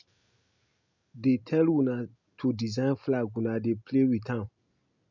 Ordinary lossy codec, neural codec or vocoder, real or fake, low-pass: MP3, 64 kbps; none; real; 7.2 kHz